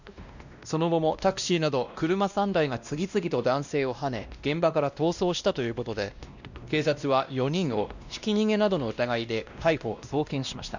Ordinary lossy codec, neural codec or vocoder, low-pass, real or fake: none; codec, 16 kHz, 1 kbps, X-Codec, WavLM features, trained on Multilingual LibriSpeech; 7.2 kHz; fake